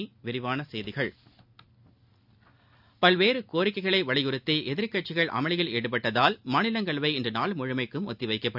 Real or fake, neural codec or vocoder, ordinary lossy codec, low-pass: real; none; none; 5.4 kHz